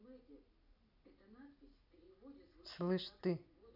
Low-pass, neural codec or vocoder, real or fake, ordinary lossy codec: 5.4 kHz; none; real; none